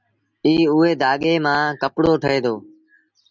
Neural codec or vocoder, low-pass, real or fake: none; 7.2 kHz; real